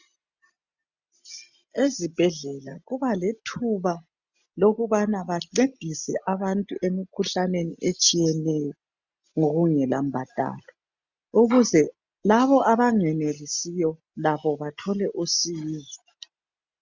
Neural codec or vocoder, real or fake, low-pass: none; real; 7.2 kHz